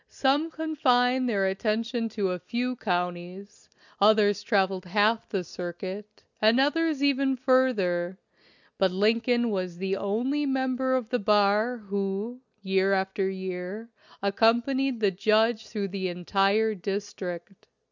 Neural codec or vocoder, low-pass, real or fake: none; 7.2 kHz; real